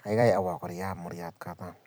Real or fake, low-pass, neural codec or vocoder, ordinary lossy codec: fake; none; vocoder, 44.1 kHz, 128 mel bands every 256 samples, BigVGAN v2; none